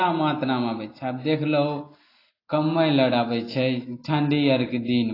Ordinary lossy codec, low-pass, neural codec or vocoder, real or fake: AAC, 24 kbps; 5.4 kHz; none; real